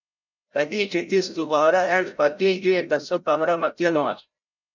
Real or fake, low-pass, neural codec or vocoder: fake; 7.2 kHz; codec, 16 kHz, 0.5 kbps, FreqCodec, larger model